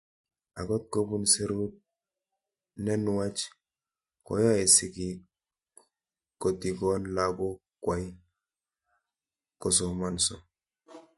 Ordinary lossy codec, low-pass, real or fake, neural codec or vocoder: MP3, 48 kbps; 14.4 kHz; real; none